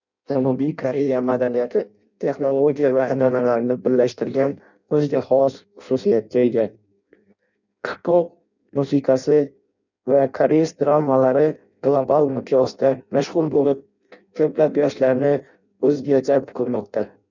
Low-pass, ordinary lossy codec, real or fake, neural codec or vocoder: 7.2 kHz; none; fake; codec, 16 kHz in and 24 kHz out, 0.6 kbps, FireRedTTS-2 codec